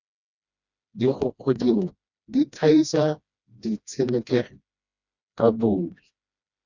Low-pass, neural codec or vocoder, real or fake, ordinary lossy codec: 7.2 kHz; codec, 16 kHz, 1 kbps, FreqCodec, smaller model; fake; Opus, 64 kbps